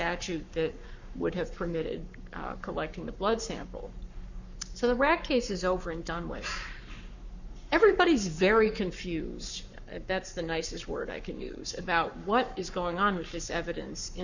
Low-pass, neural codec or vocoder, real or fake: 7.2 kHz; codec, 44.1 kHz, 7.8 kbps, Pupu-Codec; fake